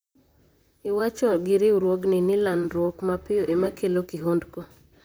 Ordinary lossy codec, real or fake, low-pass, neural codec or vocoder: none; fake; none; vocoder, 44.1 kHz, 128 mel bands, Pupu-Vocoder